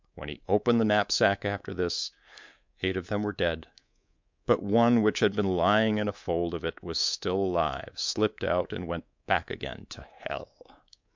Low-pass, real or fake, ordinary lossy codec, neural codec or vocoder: 7.2 kHz; fake; MP3, 64 kbps; codec, 24 kHz, 3.1 kbps, DualCodec